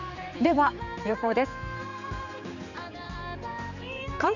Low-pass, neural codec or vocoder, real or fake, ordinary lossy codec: 7.2 kHz; codec, 16 kHz, 4 kbps, X-Codec, HuBERT features, trained on general audio; fake; none